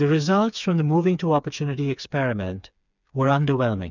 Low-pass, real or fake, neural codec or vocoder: 7.2 kHz; fake; codec, 16 kHz, 4 kbps, FreqCodec, smaller model